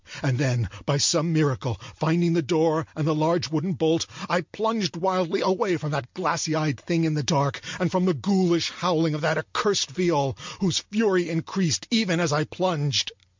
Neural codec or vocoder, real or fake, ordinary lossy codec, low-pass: none; real; MP3, 64 kbps; 7.2 kHz